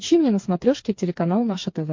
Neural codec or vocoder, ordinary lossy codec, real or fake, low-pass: codec, 16 kHz, 4 kbps, FreqCodec, smaller model; MP3, 48 kbps; fake; 7.2 kHz